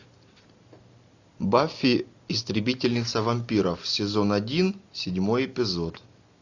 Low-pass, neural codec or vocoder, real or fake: 7.2 kHz; none; real